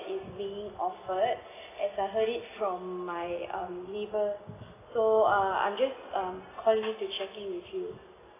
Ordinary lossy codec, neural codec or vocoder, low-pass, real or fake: AAC, 16 kbps; none; 3.6 kHz; real